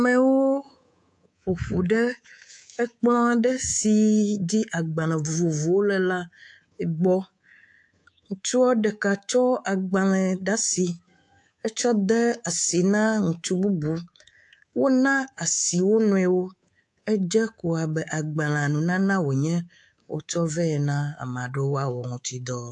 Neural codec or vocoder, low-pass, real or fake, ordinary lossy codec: codec, 24 kHz, 3.1 kbps, DualCodec; 10.8 kHz; fake; AAC, 64 kbps